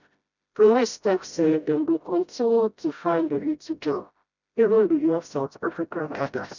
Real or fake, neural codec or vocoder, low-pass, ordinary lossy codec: fake; codec, 16 kHz, 0.5 kbps, FreqCodec, smaller model; 7.2 kHz; none